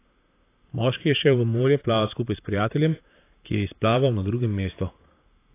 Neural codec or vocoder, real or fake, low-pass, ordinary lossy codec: vocoder, 44.1 kHz, 128 mel bands, Pupu-Vocoder; fake; 3.6 kHz; AAC, 24 kbps